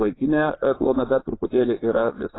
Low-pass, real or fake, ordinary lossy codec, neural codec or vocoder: 7.2 kHz; fake; AAC, 16 kbps; vocoder, 22.05 kHz, 80 mel bands, Vocos